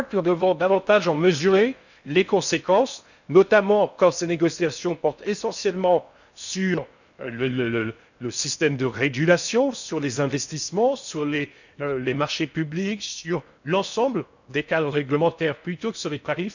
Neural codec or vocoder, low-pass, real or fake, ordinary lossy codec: codec, 16 kHz in and 24 kHz out, 0.6 kbps, FocalCodec, streaming, 4096 codes; 7.2 kHz; fake; none